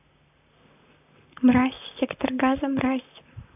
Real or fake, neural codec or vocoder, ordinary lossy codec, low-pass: fake; vocoder, 44.1 kHz, 128 mel bands, Pupu-Vocoder; AAC, 32 kbps; 3.6 kHz